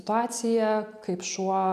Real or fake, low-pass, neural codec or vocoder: real; 14.4 kHz; none